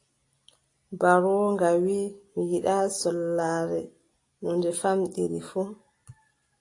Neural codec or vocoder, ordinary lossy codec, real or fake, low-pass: none; AAC, 48 kbps; real; 10.8 kHz